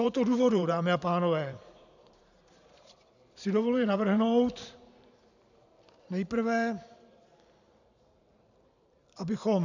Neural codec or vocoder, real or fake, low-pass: vocoder, 44.1 kHz, 128 mel bands every 512 samples, BigVGAN v2; fake; 7.2 kHz